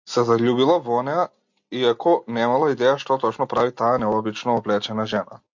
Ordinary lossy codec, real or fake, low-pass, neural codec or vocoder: MP3, 48 kbps; real; 7.2 kHz; none